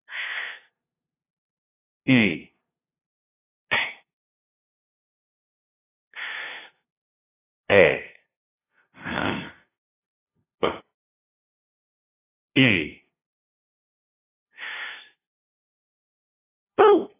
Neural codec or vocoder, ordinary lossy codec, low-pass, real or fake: codec, 16 kHz, 0.5 kbps, FunCodec, trained on LibriTTS, 25 frames a second; AAC, 16 kbps; 3.6 kHz; fake